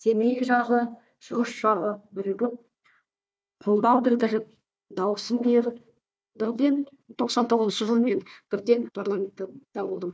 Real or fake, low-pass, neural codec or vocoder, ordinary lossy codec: fake; none; codec, 16 kHz, 1 kbps, FunCodec, trained on Chinese and English, 50 frames a second; none